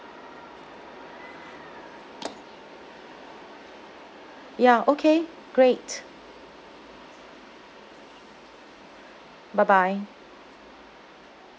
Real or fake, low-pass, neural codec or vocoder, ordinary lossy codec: real; none; none; none